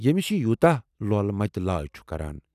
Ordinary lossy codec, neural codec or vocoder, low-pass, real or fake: none; none; 14.4 kHz; real